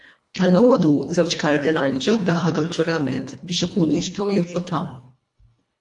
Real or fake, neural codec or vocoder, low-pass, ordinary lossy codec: fake; codec, 24 kHz, 1.5 kbps, HILCodec; 10.8 kHz; AAC, 64 kbps